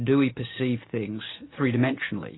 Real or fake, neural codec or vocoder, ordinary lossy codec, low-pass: real; none; AAC, 16 kbps; 7.2 kHz